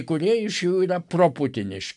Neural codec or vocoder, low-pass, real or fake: none; 10.8 kHz; real